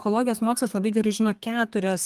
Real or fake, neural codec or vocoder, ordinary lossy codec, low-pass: fake; codec, 32 kHz, 1.9 kbps, SNAC; Opus, 24 kbps; 14.4 kHz